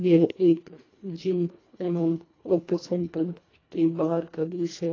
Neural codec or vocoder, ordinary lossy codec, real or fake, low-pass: codec, 24 kHz, 1.5 kbps, HILCodec; AAC, 32 kbps; fake; 7.2 kHz